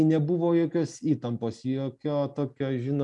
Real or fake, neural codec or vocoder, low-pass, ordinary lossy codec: real; none; 10.8 kHz; MP3, 96 kbps